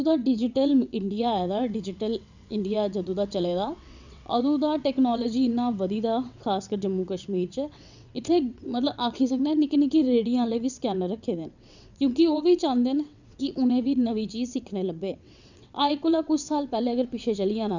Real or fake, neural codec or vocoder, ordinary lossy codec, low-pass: fake; vocoder, 22.05 kHz, 80 mel bands, WaveNeXt; none; 7.2 kHz